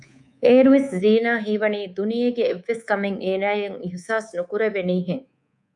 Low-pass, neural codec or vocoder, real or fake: 10.8 kHz; codec, 24 kHz, 3.1 kbps, DualCodec; fake